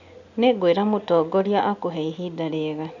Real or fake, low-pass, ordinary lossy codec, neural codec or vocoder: real; 7.2 kHz; none; none